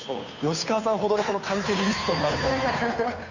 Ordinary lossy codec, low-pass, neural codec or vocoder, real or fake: none; 7.2 kHz; codec, 16 kHz, 2 kbps, FunCodec, trained on Chinese and English, 25 frames a second; fake